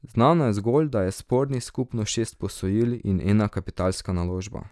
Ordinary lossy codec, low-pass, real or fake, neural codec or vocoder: none; none; real; none